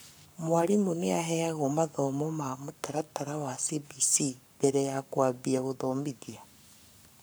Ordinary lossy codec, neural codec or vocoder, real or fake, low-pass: none; codec, 44.1 kHz, 7.8 kbps, Pupu-Codec; fake; none